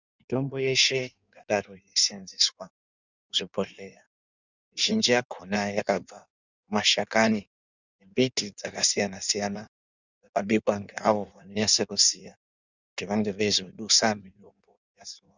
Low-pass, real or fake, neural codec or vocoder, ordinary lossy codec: 7.2 kHz; fake; codec, 16 kHz in and 24 kHz out, 1.1 kbps, FireRedTTS-2 codec; Opus, 64 kbps